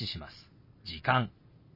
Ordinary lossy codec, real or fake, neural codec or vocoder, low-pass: MP3, 24 kbps; real; none; 5.4 kHz